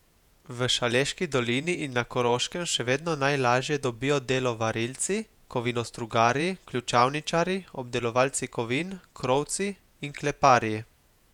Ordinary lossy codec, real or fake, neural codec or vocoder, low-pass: none; fake; vocoder, 48 kHz, 128 mel bands, Vocos; 19.8 kHz